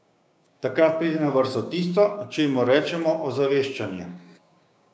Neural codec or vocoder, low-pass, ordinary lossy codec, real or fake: codec, 16 kHz, 6 kbps, DAC; none; none; fake